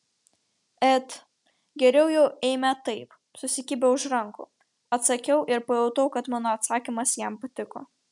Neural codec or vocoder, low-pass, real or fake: none; 10.8 kHz; real